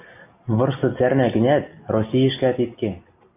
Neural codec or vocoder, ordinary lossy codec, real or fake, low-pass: none; AAC, 32 kbps; real; 3.6 kHz